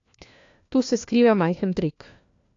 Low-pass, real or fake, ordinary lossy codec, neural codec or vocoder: 7.2 kHz; fake; AAC, 48 kbps; codec, 16 kHz, 1 kbps, FunCodec, trained on LibriTTS, 50 frames a second